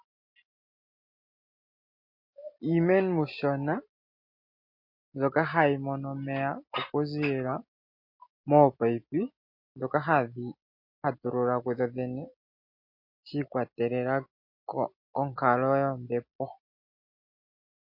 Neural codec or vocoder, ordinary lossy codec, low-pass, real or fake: none; MP3, 32 kbps; 5.4 kHz; real